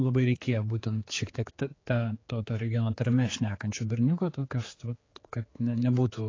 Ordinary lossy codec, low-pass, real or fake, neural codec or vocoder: AAC, 32 kbps; 7.2 kHz; fake; codec, 16 kHz, 4 kbps, X-Codec, HuBERT features, trained on general audio